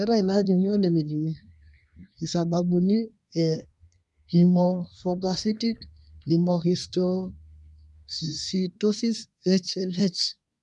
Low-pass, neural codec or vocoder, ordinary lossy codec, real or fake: 10.8 kHz; codec, 24 kHz, 1 kbps, SNAC; none; fake